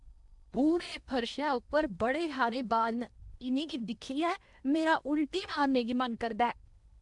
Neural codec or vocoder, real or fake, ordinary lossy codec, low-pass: codec, 16 kHz in and 24 kHz out, 0.8 kbps, FocalCodec, streaming, 65536 codes; fake; none; 10.8 kHz